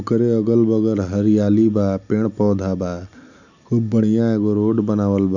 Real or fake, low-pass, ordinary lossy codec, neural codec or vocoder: real; 7.2 kHz; none; none